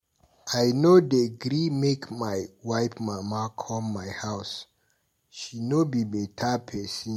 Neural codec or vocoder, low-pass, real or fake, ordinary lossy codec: none; 19.8 kHz; real; MP3, 64 kbps